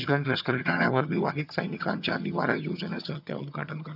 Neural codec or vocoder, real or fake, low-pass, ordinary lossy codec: vocoder, 22.05 kHz, 80 mel bands, HiFi-GAN; fake; 5.4 kHz; none